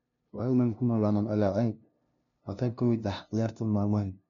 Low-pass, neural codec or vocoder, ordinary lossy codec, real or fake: 7.2 kHz; codec, 16 kHz, 0.5 kbps, FunCodec, trained on LibriTTS, 25 frames a second; none; fake